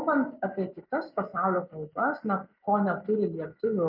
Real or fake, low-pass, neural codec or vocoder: real; 5.4 kHz; none